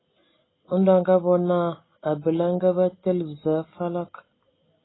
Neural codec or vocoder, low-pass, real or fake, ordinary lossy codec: none; 7.2 kHz; real; AAC, 16 kbps